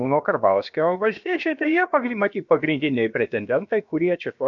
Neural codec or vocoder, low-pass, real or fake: codec, 16 kHz, about 1 kbps, DyCAST, with the encoder's durations; 7.2 kHz; fake